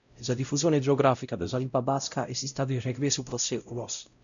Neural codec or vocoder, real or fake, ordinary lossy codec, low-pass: codec, 16 kHz, 0.5 kbps, X-Codec, WavLM features, trained on Multilingual LibriSpeech; fake; MP3, 96 kbps; 7.2 kHz